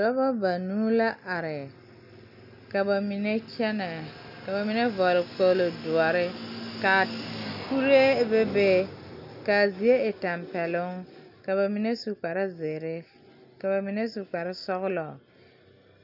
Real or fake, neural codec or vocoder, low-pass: real; none; 5.4 kHz